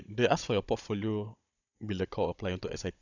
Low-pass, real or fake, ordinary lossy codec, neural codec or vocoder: 7.2 kHz; fake; none; codec, 16 kHz, 16 kbps, FunCodec, trained on Chinese and English, 50 frames a second